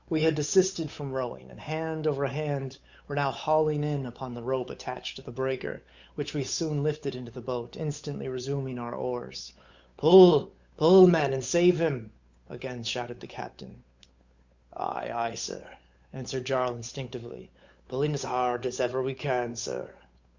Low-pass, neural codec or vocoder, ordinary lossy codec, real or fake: 7.2 kHz; codec, 16 kHz, 8 kbps, FunCodec, trained on Chinese and English, 25 frames a second; Opus, 64 kbps; fake